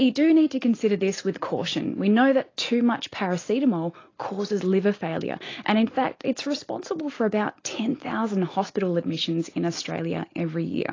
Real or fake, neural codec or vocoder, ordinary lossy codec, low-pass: real; none; AAC, 32 kbps; 7.2 kHz